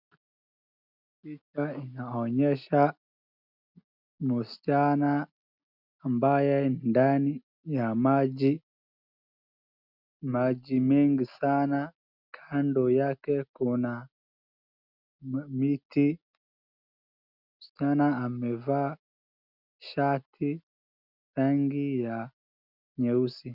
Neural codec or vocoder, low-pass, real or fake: none; 5.4 kHz; real